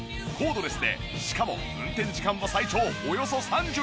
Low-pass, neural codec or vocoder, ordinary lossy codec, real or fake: none; none; none; real